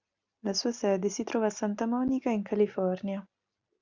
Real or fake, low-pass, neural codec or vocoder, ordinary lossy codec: real; 7.2 kHz; none; MP3, 64 kbps